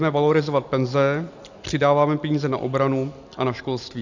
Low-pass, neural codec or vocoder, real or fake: 7.2 kHz; none; real